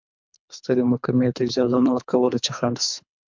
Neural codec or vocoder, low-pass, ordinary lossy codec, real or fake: codec, 24 kHz, 3 kbps, HILCodec; 7.2 kHz; MP3, 64 kbps; fake